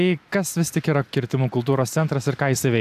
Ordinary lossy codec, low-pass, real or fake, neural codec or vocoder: AAC, 96 kbps; 14.4 kHz; real; none